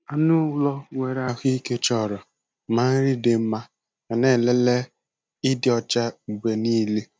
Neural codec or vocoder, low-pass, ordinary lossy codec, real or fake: none; none; none; real